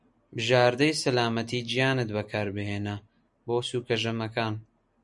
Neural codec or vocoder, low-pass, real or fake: none; 10.8 kHz; real